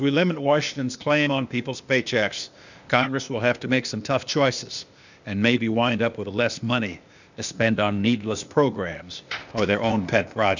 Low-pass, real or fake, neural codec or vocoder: 7.2 kHz; fake; codec, 16 kHz, 0.8 kbps, ZipCodec